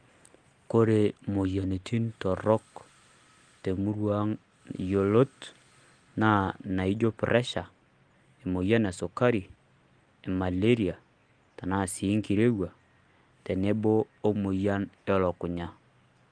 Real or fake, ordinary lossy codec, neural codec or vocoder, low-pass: real; Opus, 24 kbps; none; 9.9 kHz